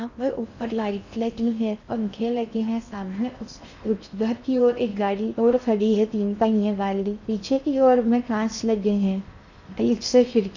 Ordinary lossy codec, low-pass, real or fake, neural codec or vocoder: none; 7.2 kHz; fake; codec, 16 kHz in and 24 kHz out, 0.6 kbps, FocalCodec, streaming, 4096 codes